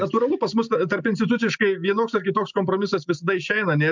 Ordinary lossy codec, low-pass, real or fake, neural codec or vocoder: MP3, 64 kbps; 7.2 kHz; real; none